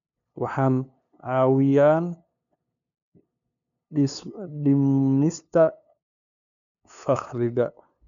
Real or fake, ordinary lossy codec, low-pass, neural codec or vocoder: fake; none; 7.2 kHz; codec, 16 kHz, 2 kbps, FunCodec, trained on LibriTTS, 25 frames a second